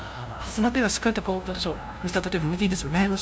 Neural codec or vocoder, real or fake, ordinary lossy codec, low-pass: codec, 16 kHz, 0.5 kbps, FunCodec, trained on LibriTTS, 25 frames a second; fake; none; none